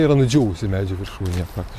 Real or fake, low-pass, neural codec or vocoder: real; 14.4 kHz; none